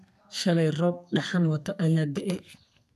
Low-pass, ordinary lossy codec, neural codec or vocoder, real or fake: 14.4 kHz; none; codec, 32 kHz, 1.9 kbps, SNAC; fake